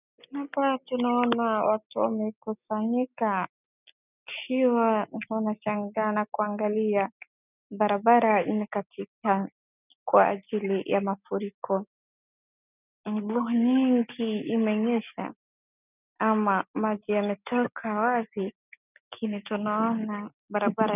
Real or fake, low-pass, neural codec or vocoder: real; 3.6 kHz; none